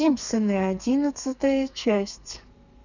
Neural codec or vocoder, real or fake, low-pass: codec, 32 kHz, 1.9 kbps, SNAC; fake; 7.2 kHz